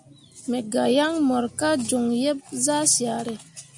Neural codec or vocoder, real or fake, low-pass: none; real; 10.8 kHz